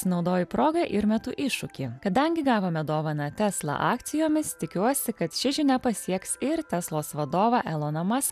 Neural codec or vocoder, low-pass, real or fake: none; 14.4 kHz; real